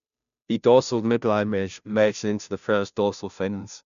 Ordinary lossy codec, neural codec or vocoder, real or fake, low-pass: none; codec, 16 kHz, 0.5 kbps, FunCodec, trained on Chinese and English, 25 frames a second; fake; 7.2 kHz